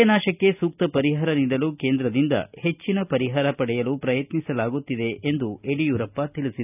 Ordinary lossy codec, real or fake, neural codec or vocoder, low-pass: none; real; none; 3.6 kHz